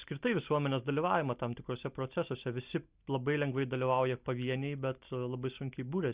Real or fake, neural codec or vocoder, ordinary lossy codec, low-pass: real; none; Opus, 64 kbps; 3.6 kHz